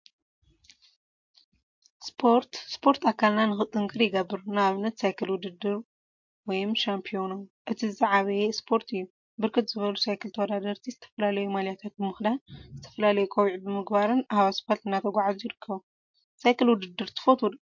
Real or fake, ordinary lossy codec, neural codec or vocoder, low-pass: real; MP3, 48 kbps; none; 7.2 kHz